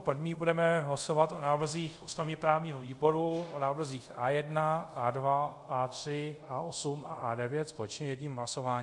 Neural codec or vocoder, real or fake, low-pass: codec, 24 kHz, 0.5 kbps, DualCodec; fake; 10.8 kHz